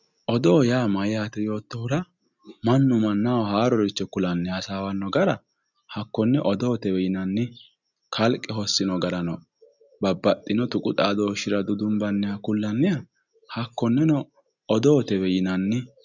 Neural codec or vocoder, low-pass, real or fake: none; 7.2 kHz; real